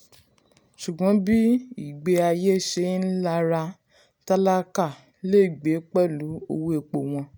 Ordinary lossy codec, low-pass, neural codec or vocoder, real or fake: none; none; none; real